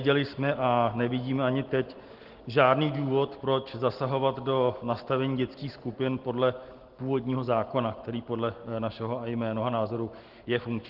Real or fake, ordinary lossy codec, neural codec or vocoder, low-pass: real; Opus, 16 kbps; none; 5.4 kHz